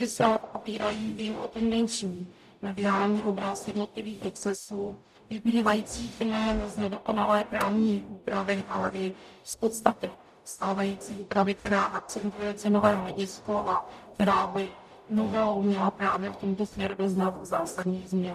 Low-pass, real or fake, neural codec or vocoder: 14.4 kHz; fake; codec, 44.1 kHz, 0.9 kbps, DAC